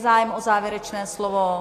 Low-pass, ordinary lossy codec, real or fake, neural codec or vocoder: 14.4 kHz; AAC, 48 kbps; real; none